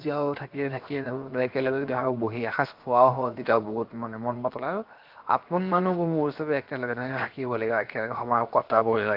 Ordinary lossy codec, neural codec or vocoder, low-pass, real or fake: Opus, 32 kbps; codec, 16 kHz, 0.7 kbps, FocalCodec; 5.4 kHz; fake